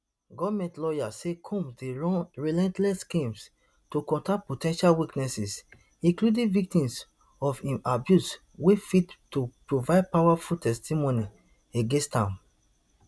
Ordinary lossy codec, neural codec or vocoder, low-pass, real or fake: none; none; none; real